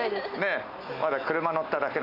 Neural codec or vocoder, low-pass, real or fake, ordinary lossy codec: none; 5.4 kHz; real; none